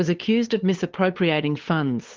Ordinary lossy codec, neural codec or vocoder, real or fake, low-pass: Opus, 16 kbps; codec, 16 kHz, 8 kbps, FunCodec, trained on LibriTTS, 25 frames a second; fake; 7.2 kHz